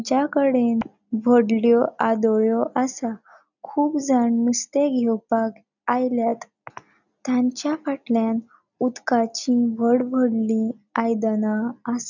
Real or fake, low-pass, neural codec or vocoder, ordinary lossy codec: real; 7.2 kHz; none; none